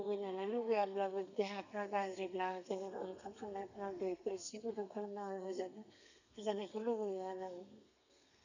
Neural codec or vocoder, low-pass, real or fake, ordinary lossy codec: codec, 32 kHz, 1.9 kbps, SNAC; 7.2 kHz; fake; none